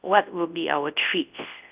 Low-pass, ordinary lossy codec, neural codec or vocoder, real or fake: 3.6 kHz; Opus, 24 kbps; codec, 24 kHz, 0.9 kbps, WavTokenizer, large speech release; fake